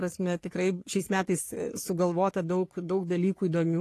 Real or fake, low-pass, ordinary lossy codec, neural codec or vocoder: fake; 14.4 kHz; AAC, 48 kbps; codec, 44.1 kHz, 3.4 kbps, Pupu-Codec